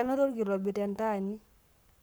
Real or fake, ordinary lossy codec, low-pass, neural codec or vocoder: fake; none; none; codec, 44.1 kHz, 7.8 kbps, Pupu-Codec